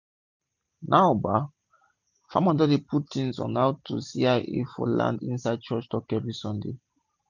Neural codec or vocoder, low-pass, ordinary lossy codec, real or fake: none; 7.2 kHz; none; real